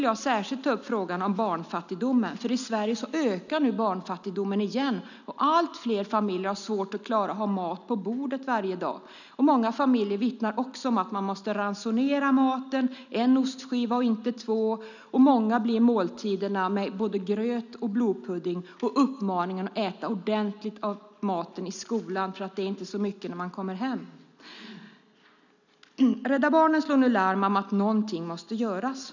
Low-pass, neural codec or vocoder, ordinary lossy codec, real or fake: 7.2 kHz; none; none; real